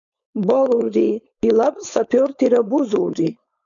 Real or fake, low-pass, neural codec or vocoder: fake; 7.2 kHz; codec, 16 kHz, 4.8 kbps, FACodec